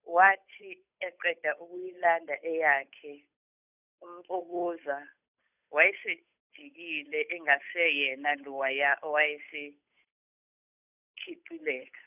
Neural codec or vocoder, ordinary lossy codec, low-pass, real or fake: codec, 16 kHz, 8 kbps, FunCodec, trained on Chinese and English, 25 frames a second; none; 3.6 kHz; fake